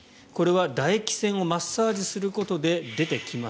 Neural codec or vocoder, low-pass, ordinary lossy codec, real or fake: none; none; none; real